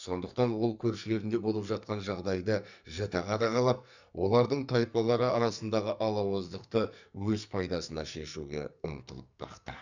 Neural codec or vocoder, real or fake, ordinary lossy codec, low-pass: codec, 44.1 kHz, 2.6 kbps, SNAC; fake; none; 7.2 kHz